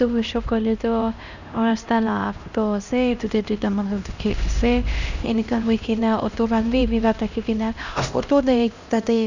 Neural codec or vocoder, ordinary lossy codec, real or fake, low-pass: codec, 16 kHz, 1 kbps, X-Codec, HuBERT features, trained on LibriSpeech; none; fake; 7.2 kHz